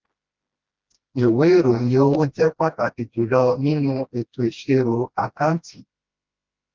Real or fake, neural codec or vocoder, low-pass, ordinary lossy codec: fake; codec, 16 kHz, 1 kbps, FreqCodec, smaller model; 7.2 kHz; Opus, 32 kbps